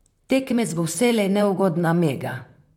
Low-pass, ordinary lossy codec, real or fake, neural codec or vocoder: 19.8 kHz; MP3, 96 kbps; fake; vocoder, 44.1 kHz, 128 mel bands, Pupu-Vocoder